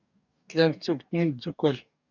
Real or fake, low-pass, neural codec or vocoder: fake; 7.2 kHz; codec, 44.1 kHz, 2.6 kbps, DAC